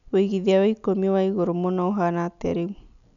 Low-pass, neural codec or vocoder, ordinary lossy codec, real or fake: 7.2 kHz; none; none; real